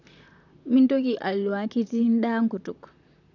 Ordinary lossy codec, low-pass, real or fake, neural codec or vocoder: none; 7.2 kHz; fake; vocoder, 44.1 kHz, 128 mel bands, Pupu-Vocoder